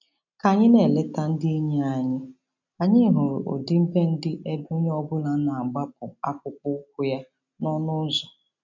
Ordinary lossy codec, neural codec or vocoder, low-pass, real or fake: none; none; 7.2 kHz; real